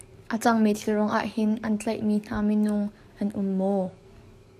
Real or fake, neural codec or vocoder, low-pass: fake; codec, 44.1 kHz, 7.8 kbps, DAC; 14.4 kHz